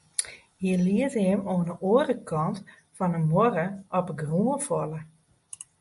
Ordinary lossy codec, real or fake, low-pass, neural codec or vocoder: MP3, 48 kbps; real; 14.4 kHz; none